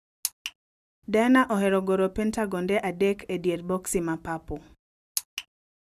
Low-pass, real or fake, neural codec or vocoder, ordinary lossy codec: 14.4 kHz; real; none; none